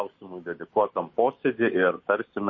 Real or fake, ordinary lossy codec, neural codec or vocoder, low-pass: real; MP3, 32 kbps; none; 7.2 kHz